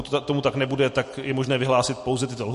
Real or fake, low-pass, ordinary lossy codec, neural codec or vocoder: real; 14.4 kHz; MP3, 48 kbps; none